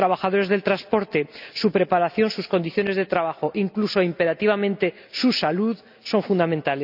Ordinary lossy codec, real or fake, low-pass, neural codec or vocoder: none; real; 5.4 kHz; none